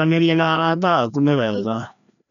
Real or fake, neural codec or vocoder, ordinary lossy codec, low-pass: fake; codec, 16 kHz, 1 kbps, FreqCodec, larger model; none; 7.2 kHz